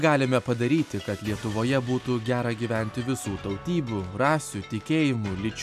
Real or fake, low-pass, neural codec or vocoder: real; 14.4 kHz; none